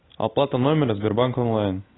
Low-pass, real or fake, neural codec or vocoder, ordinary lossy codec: 7.2 kHz; real; none; AAC, 16 kbps